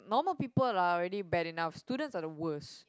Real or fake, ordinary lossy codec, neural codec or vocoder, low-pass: real; none; none; none